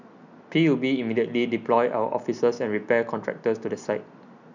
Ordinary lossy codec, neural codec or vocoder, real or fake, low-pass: none; none; real; 7.2 kHz